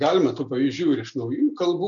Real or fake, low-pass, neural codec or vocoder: real; 7.2 kHz; none